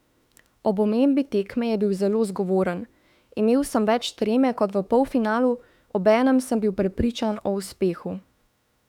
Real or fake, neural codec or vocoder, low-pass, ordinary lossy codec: fake; autoencoder, 48 kHz, 32 numbers a frame, DAC-VAE, trained on Japanese speech; 19.8 kHz; none